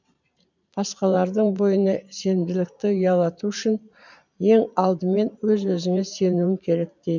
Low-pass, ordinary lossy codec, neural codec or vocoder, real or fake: 7.2 kHz; none; vocoder, 44.1 kHz, 128 mel bands every 512 samples, BigVGAN v2; fake